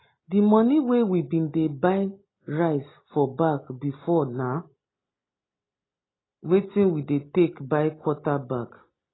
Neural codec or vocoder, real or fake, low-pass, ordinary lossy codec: none; real; 7.2 kHz; AAC, 16 kbps